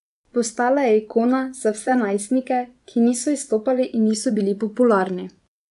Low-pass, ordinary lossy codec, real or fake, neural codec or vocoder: 10.8 kHz; none; fake; vocoder, 24 kHz, 100 mel bands, Vocos